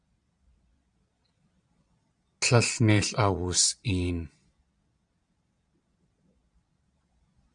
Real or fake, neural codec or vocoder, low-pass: fake; vocoder, 22.05 kHz, 80 mel bands, Vocos; 9.9 kHz